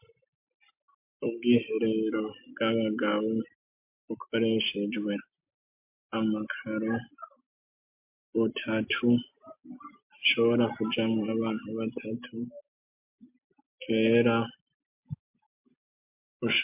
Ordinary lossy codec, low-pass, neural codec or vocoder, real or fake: MP3, 32 kbps; 3.6 kHz; none; real